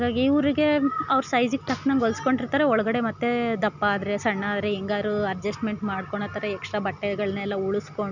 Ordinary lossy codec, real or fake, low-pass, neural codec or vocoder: none; real; 7.2 kHz; none